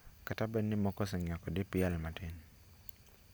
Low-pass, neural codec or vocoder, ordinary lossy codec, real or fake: none; none; none; real